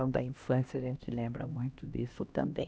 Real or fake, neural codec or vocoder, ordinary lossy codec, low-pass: fake; codec, 16 kHz, 1 kbps, X-Codec, HuBERT features, trained on LibriSpeech; none; none